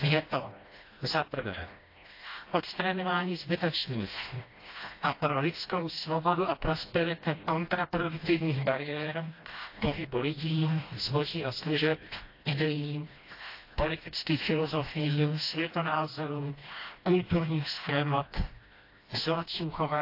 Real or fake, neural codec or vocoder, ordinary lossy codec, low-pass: fake; codec, 16 kHz, 1 kbps, FreqCodec, smaller model; AAC, 32 kbps; 5.4 kHz